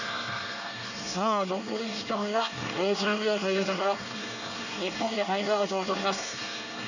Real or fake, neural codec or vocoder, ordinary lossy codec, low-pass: fake; codec, 24 kHz, 1 kbps, SNAC; none; 7.2 kHz